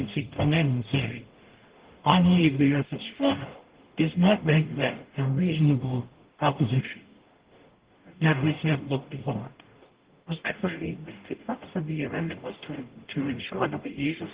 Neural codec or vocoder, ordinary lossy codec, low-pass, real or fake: codec, 44.1 kHz, 0.9 kbps, DAC; Opus, 16 kbps; 3.6 kHz; fake